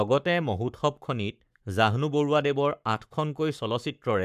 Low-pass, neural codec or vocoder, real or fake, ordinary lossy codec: 14.4 kHz; codec, 44.1 kHz, 7.8 kbps, Pupu-Codec; fake; none